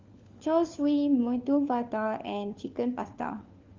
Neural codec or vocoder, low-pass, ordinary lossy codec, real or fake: codec, 16 kHz, 4 kbps, FunCodec, trained on LibriTTS, 50 frames a second; 7.2 kHz; Opus, 32 kbps; fake